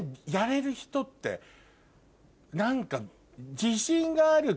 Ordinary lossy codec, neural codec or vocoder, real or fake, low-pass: none; none; real; none